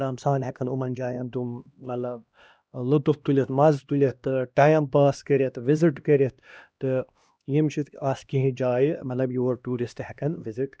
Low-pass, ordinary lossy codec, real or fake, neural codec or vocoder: none; none; fake; codec, 16 kHz, 1 kbps, X-Codec, HuBERT features, trained on LibriSpeech